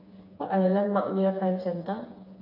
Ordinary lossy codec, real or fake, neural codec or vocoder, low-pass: none; fake; codec, 16 kHz, 4 kbps, FreqCodec, smaller model; 5.4 kHz